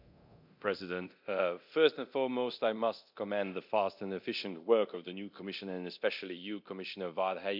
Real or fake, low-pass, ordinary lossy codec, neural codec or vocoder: fake; 5.4 kHz; MP3, 48 kbps; codec, 24 kHz, 0.9 kbps, DualCodec